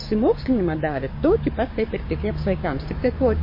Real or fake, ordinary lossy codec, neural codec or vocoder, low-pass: fake; MP3, 24 kbps; codec, 16 kHz, 6 kbps, DAC; 5.4 kHz